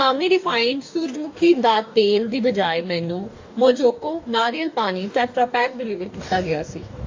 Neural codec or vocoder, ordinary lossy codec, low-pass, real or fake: codec, 44.1 kHz, 2.6 kbps, DAC; AAC, 48 kbps; 7.2 kHz; fake